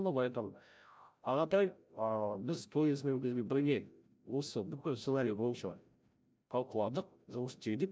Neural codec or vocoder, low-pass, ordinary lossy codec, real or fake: codec, 16 kHz, 0.5 kbps, FreqCodec, larger model; none; none; fake